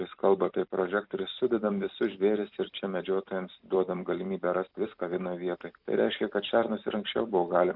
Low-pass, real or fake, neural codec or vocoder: 5.4 kHz; fake; vocoder, 44.1 kHz, 128 mel bands every 256 samples, BigVGAN v2